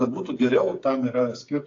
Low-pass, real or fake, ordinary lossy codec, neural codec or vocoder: 7.2 kHz; fake; AAC, 48 kbps; codec, 16 kHz, 8 kbps, FreqCodec, smaller model